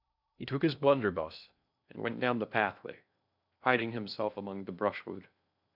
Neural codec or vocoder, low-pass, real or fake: codec, 16 kHz in and 24 kHz out, 0.8 kbps, FocalCodec, streaming, 65536 codes; 5.4 kHz; fake